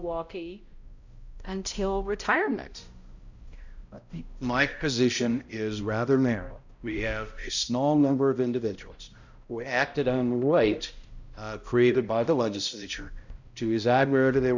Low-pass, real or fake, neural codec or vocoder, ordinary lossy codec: 7.2 kHz; fake; codec, 16 kHz, 0.5 kbps, X-Codec, HuBERT features, trained on balanced general audio; Opus, 64 kbps